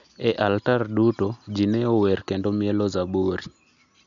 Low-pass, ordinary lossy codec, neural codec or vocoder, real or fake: 7.2 kHz; none; none; real